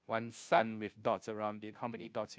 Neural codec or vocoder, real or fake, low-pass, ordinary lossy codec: codec, 16 kHz, 0.5 kbps, FunCodec, trained on Chinese and English, 25 frames a second; fake; none; none